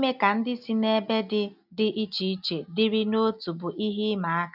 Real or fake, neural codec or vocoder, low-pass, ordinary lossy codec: real; none; 5.4 kHz; none